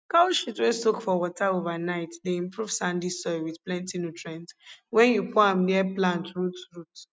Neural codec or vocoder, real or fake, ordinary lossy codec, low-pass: none; real; none; none